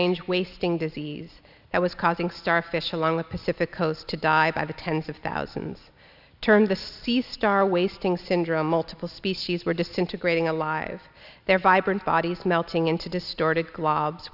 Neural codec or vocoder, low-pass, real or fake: none; 5.4 kHz; real